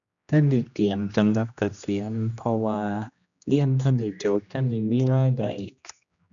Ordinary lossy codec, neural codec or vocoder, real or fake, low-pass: none; codec, 16 kHz, 1 kbps, X-Codec, HuBERT features, trained on general audio; fake; 7.2 kHz